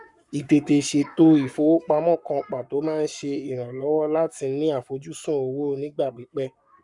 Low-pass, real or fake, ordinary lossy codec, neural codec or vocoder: 10.8 kHz; fake; none; codec, 44.1 kHz, 7.8 kbps, Pupu-Codec